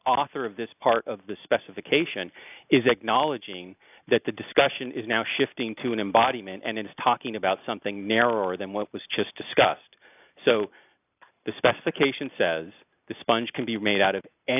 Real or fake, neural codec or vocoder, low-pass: real; none; 3.6 kHz